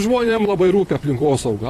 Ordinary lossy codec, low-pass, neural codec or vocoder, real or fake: AAC, 48 kbps; 14.4 kHz; vocoder, 44.1 kHz, 128 mel bands, Pupu-Vocoder; fake